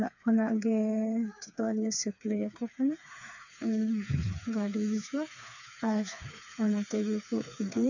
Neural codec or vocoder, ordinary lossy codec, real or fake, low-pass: codec, 16 kHz, 4 kbps, FreqCodec, smaller model; none; fake; 7.2 kHz